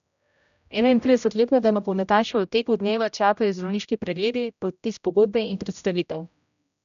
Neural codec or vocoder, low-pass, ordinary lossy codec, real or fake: codec, 16 kHz, 0.5 kbps, X-Codec, HuBERT features, trained on general audio; 7.2 kHz; none; fake